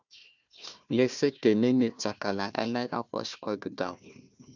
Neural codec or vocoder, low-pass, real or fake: codec, 16 kHz, 1 kbps, FunCodec, trained on Chinese and English, 50 frames a second; 7.2 kHz; fake